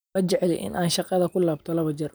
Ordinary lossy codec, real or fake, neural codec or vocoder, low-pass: none; real; none; none